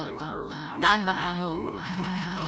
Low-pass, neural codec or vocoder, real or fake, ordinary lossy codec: none; codec, 16 kHz, 0.5 kbps, FreqCodec, larger model; fake; none